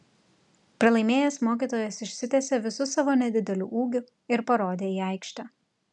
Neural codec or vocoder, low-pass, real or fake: none; 10.8 kHz; real